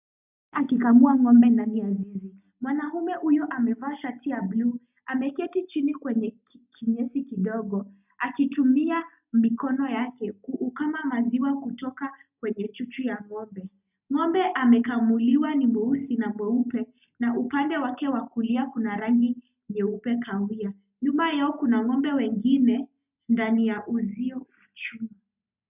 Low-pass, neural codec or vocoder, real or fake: 3.6 kHz; none; real